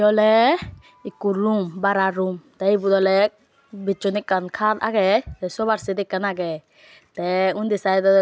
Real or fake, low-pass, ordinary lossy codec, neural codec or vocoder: real; none; none; none